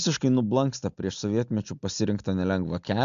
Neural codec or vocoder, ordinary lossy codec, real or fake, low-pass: none; MP3, 64 kbps; real; 7.2 kHz